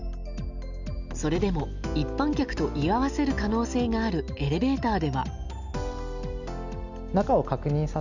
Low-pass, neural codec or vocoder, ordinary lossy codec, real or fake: 7.2 kHz; none; none; real